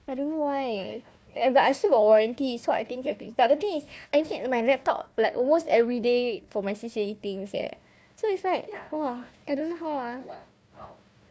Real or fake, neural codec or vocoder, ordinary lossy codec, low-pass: fake; codec, 16 kHz, 1 kbps, FunCodec, trained on Chinese and English, 50 frames a second; none; none